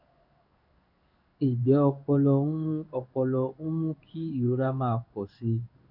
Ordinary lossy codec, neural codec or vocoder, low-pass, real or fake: none; codec, 16 kHz in and 24 kHz out, 1 kbps, XY-Tokenizer; 5.4 kHz; fake